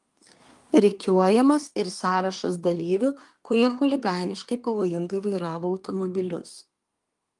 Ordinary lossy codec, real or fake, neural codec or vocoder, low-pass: Opus, 32 kbps; fake; codec, 24 kHz, 1 kbps, SNAC; 10.8 kHz